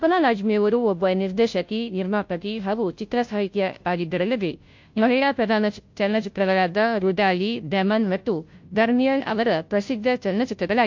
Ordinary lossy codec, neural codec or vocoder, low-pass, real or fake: MP3, 48 kbps; codec, 16 kHz, 0.5 kbps, FunCodec, trained on Chinese and English, 25 frames a second; 7.2 kHz; fake